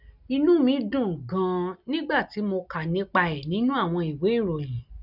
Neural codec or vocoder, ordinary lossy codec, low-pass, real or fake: none; none; 5.4 kHz; real